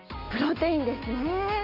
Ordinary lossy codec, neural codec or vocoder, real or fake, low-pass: none; none; real; 5.4 kHz